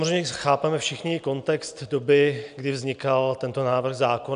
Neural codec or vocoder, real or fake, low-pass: none; real; 9.9 kHz